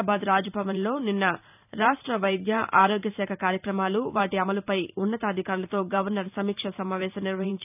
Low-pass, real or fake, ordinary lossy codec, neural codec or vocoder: 3.6 kHz; fake; none; vocoder, 44.1 kHz, 128 mel bands every 512 samples, BigVGAN v2